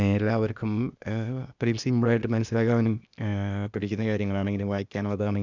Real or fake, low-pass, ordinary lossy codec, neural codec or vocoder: fake; 7.2 kHz; none; codec, 16 kHz, 0.8 kbps, ZipCodec